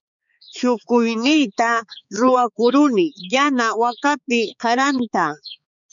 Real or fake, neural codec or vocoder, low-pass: fake; codec, 16 kHz, 4 kbps, X-Codec, HuBERT features, trained on balanced general audio; 7.2 kHz